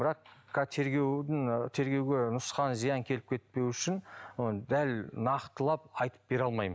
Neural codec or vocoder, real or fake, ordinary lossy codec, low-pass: none; real; none; none